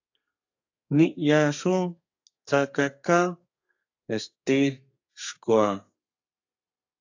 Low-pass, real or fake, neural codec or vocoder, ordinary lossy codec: 7.2 kHz; fake; codec, 32 kHz, 1.9 kbps, SNAC; AAC, 48 kbps